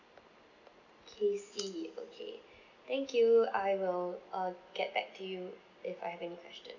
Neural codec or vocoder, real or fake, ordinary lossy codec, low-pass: none; real; none; 7.2 kHz